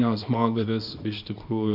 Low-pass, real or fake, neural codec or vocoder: 5.4 kHz; fake; codec, 24 kHz, 0.9 kbps, WavTokenizer, small release